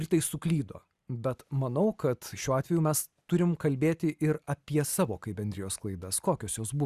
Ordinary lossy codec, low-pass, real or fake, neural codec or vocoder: Opus, 64 kbps; 14.4 kHz; real; none